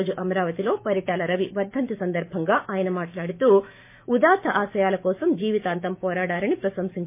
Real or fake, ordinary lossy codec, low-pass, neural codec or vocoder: fake; MP3, 24 kbps; 3.6 kHz; autoencoder, 48 kHz, 128 numbers a frame, DAC-VAE, trained on Japanese speech